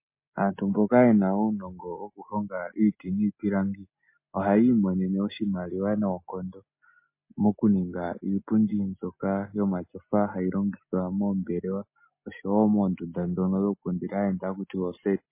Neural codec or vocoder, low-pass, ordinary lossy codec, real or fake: none; 3.6 kHz; MP3, 24 kbps; real